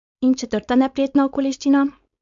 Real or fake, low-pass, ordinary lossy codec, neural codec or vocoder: fake; 7.2 kHz; AAC, 64 kbps; codec, 16 kHz, 4.8 kbps, FACodec